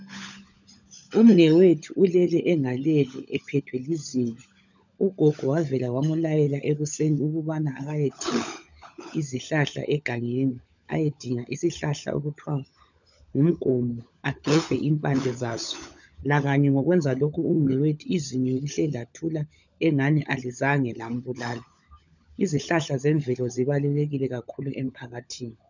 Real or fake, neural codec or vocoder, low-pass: fake; codec, 16 kHz, 16 kbps, FunCodec, trained on LibriTTS, 50 frames a second; 7.2 kHz